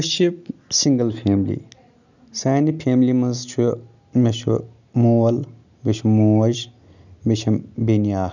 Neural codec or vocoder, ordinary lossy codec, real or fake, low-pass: none; none; real; 7.2 kHz